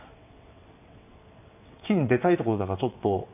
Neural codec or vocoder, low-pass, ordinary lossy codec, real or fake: vocoder, 22.05 kHz, 80 mel bands, WaveNeXt; 3.6 kHz; none; fake